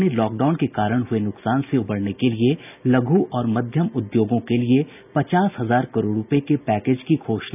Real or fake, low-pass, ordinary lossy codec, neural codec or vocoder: real; 3.6 kHz; none; none